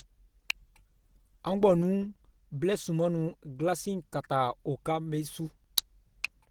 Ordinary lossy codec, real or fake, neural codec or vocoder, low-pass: Opus, 16 kbps; real; none; 19.8 kHz